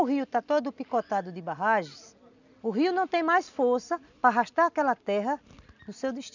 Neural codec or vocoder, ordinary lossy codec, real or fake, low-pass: none; none; real; 7.2 kHz